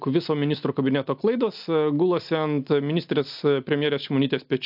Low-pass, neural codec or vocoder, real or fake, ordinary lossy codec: 5.4 kHz; none; real; AAC, 48 kbps